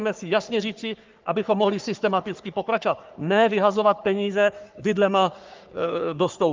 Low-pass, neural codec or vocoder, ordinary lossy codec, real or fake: 7.2 kHz; codec, 44.1 kHz, 3.4 kbps, Pupu-Codec; Opus, 32 kbps; fake